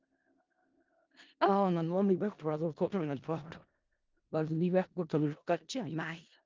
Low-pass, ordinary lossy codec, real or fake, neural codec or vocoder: 7.2 kHz; Opus, 24 kbps; fake; codec, 16 kHz in and 24 kHz out, 0.4 kbps, LongCat-Audio-Codec, four codebook decoder